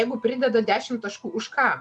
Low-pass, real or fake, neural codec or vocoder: 10.8 kHz; real; none